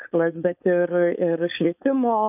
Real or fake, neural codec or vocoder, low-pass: fake; codec, 16 kHz, 4.8 kbps, FACodec; 3.6 kHz